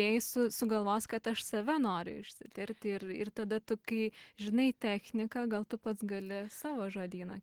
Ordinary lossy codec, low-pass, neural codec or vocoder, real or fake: Opus, 16 kbps; 19.8 kHz; none; real